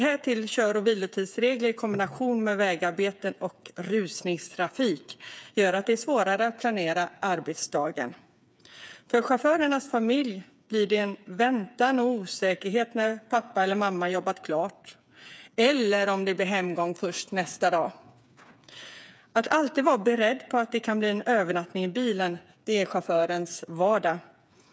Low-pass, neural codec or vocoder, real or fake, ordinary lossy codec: none; codec, 16 kHz, 8 kbps, FreqCodec, smaller model; fake; none